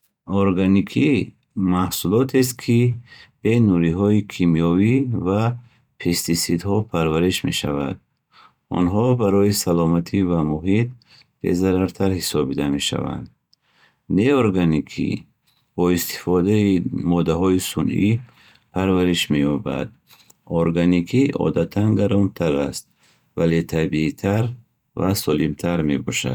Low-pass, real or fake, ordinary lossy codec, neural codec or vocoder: 19.8 kHz; fake; none; vocoder, 44.1 kHz, 128 mel bands every 512 samples, BigVGAN v2